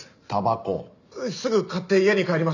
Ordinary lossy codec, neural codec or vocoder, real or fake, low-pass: none; none; real; 7.2 kHz